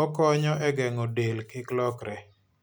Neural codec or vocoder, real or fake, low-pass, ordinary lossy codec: none; real; none; none